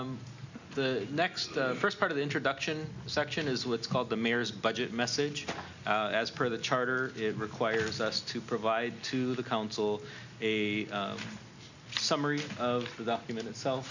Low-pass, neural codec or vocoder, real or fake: 7.2 kHz; none; real